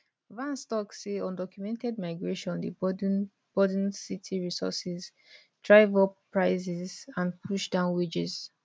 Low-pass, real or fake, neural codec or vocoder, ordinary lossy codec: none; real; none; none